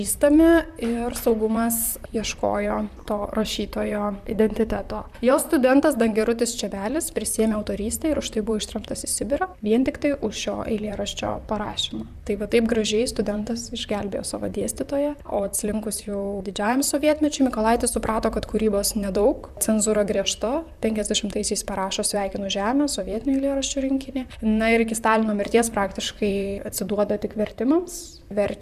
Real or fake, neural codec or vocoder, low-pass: fake; vocoder, 44.1 kHz, 128 mel bands, Pupu-Vocoder; 14.4 kHz